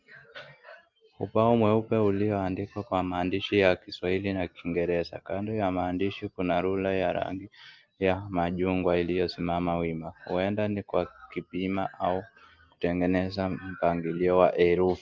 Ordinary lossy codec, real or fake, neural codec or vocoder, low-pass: Opus, 32 kbps; real; none; 7.2 kHz